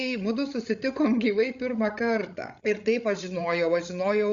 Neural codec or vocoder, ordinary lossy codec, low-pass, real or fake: codec, 16 kHz, 16 kbps, FreqCodec, larger model; Opus, 64 kbps; 7.2 kHz; fake